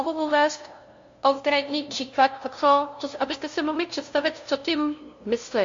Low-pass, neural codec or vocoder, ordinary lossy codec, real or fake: 7.2 kHz; codec, 16 kHz, 0.5 kbps, FunCodec, trained on LibriTTS, 25 frames a second; MP3, 48 kbps; fake